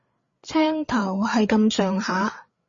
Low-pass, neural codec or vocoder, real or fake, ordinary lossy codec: 7.2 kHz; codec, 16 kHz, 8 kbps, FreqCodec, larger model; fake; MP3, 32 kbps